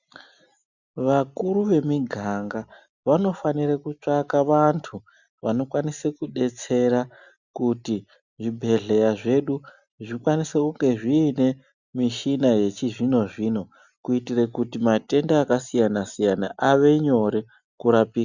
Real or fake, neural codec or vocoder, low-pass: real; none; 7.2 kHz